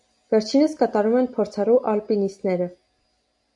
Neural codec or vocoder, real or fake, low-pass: none; real; 10.8 kHz